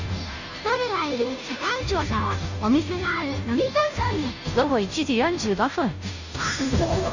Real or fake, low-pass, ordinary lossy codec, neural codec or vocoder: fake; 7.2 kHz; none; codec, 16 kHz, 0.5 kbps, FunCodec, trained on Chinese and English, 25 frames a second